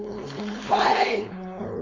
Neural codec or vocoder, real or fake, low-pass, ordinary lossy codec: codec, 16 kHz, 4.8 kbps, FACodec; fake; 7.2 kHz; MP3, 48 kbps